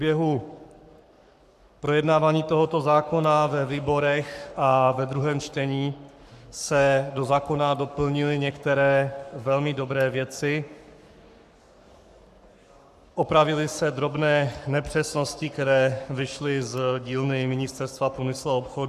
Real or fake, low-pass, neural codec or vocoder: fake; 14.4 kHz; codec, 44.1 kHz, 7.8 kbps, Pupu-Codec